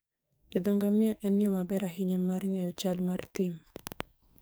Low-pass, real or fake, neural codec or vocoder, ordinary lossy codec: none; fake; codec, 44.1 kHz, 2.6 kbps, SNAC; none